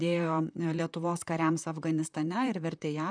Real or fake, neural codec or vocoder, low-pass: fake; vocoder, 44.1 kHz, 128 mel bands, Pupu-Vocoder; 9.9 kHz